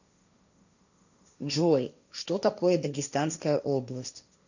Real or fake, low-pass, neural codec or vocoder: fake; 7.2 kHz; codec, 16 kHz, 1.1 kbps, Voila-Tokenizer